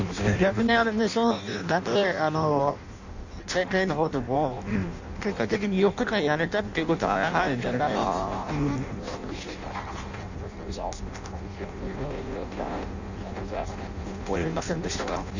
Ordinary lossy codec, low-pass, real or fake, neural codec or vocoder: none; 7.2 kHz; fake; codec, 16 kHz in and 24 kHz out, 0.6 kbps, FireRedTTS-2 codec